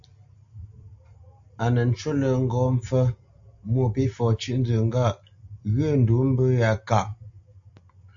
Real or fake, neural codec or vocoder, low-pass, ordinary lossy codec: real; none; 7.2 kHz; AAC, 64 kbps